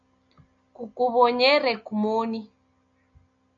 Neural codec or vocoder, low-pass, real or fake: none; 7.2 kHz; real